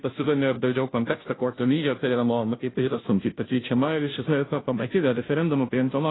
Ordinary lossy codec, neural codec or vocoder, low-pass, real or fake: AAC, 16 kbps; codec, 16 kHz, 0.5 kbps, FunCodec, trained on Chinese and English, 25 frames a second; 7.2 kHz; fake